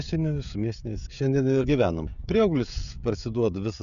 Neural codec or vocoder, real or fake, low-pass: codec, 16 kHz, 16 kbps, FreqCodec, smaller model; fake; 7.2 kHz